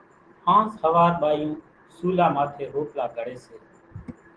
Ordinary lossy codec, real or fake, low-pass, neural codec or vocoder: Opus, 16 kbps; real; 9.9 kHz; none